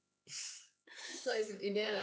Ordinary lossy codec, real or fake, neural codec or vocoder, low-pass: none; fake; codec, 16 kHz, 4 kbps, X-Codec, HuBERT features, trained on LibriSpeech; none